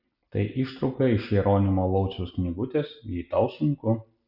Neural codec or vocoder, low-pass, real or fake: none; 5.4 kHz; real